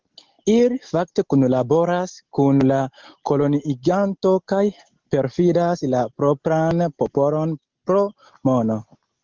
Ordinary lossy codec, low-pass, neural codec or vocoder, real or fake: Opus, 16 kbps; 7.2 kHz; none; real